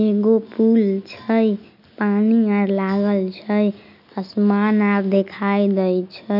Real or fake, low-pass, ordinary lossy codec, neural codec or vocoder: real; 5.4 kHz; none; none